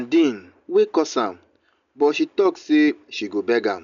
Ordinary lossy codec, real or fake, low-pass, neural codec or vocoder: none; real; 7.2 kHz; none